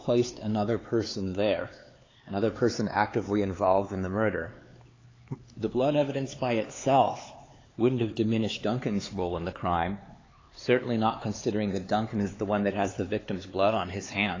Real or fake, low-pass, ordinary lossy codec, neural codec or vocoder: fake; 7.2 kHz; AAC, 32 kbps; codec, 16 kHz, 4 kbps, X-Codec, HuBERT features, trained on LibriSpeech